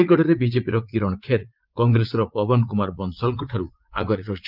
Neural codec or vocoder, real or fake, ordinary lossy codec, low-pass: vocoder, 44.1 kHz, 80 mel bands, Vocos; fake; Opus, 32 kbps; 5.4 kHz